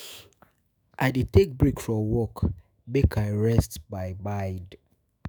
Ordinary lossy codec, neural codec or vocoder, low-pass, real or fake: none; autoencoder, 48 kHz, 128 numbers a frame, DAC-VAE, trained on Japanese speech; none; fake